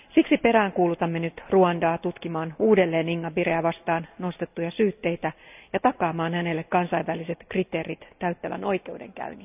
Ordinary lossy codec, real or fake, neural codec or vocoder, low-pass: none; real; none; 3.6 kHz